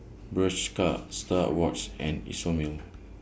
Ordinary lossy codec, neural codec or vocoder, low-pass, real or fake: none; none; none; real